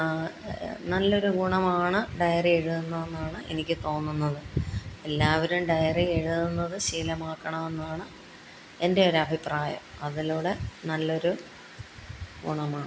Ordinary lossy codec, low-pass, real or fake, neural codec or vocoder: none; none; real; none